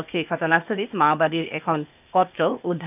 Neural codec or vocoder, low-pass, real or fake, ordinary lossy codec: codec, 16 kHz, 0.8 kbps, ZipCodec; 3.6 kHz; fake; none